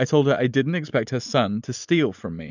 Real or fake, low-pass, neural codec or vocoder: real; 7.2 kHz; none